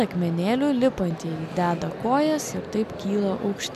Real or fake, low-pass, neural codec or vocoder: real; 14.4 kHz; none